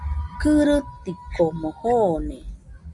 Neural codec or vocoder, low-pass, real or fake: none; 10.8 kHz; real